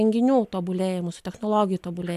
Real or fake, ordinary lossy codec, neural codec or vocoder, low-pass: real; AAC, 96 kbps; none; 14.4 kHz